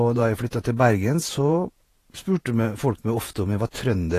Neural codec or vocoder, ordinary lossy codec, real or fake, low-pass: vocoder, 48 kHz, 128 mel bands, Vocos; AAC, 48 kbps; fake; 14.4 kHz